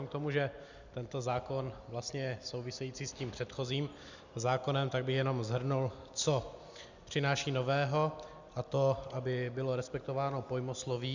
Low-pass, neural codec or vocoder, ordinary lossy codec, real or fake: 7.2 kHz; none; Opus, 64 kbps; real